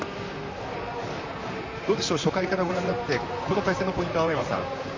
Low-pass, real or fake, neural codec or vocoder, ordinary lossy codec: 7.2 kHz; fake; vocoder, 44.1 kHz, 128 mel bands, Pupu-Vocoder; MP3, 64 kbps